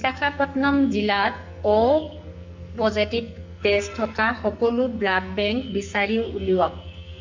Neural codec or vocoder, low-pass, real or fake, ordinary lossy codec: codec, 44.1 kHz, 2.6 kbps, SNAC; 7.2 kHz; fake; MP3, 64 kbps